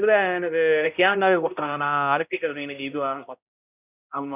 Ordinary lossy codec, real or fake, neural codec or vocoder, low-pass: none; fake; codec, 16 kHz, 0.5 kbps, X-Codec, HuBERT features, trained on balanced general audio; 3.6 kHz